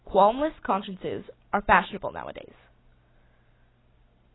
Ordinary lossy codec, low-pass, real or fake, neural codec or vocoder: AAC, 16 kbps; 7.2 kHz; real; none